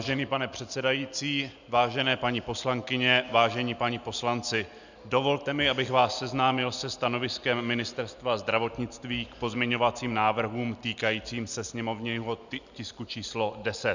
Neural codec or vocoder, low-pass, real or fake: none; 7.2 kHz; real